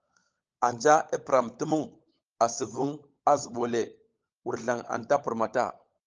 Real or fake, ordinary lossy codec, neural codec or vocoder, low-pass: fake; Opus, 24 kbps; codec, 16 kHz, 16 kbps, FunCodec, trained on LibriTTS, 50 frames a second; 7.2 kHz